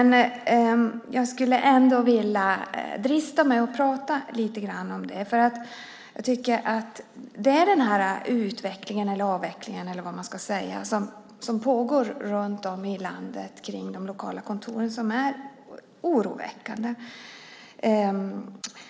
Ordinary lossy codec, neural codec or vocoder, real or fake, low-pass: none; none; real; none